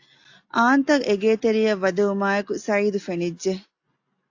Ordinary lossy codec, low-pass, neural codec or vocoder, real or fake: AAC, 48 kbps; 7.2 kHz; none; real